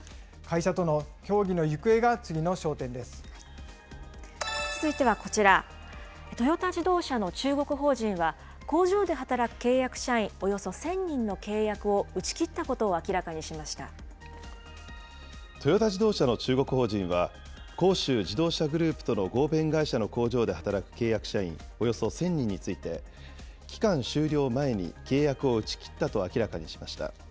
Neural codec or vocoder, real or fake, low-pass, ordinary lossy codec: none; real; none; none